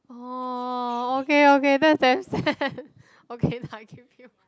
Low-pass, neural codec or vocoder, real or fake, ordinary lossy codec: none; none; real; none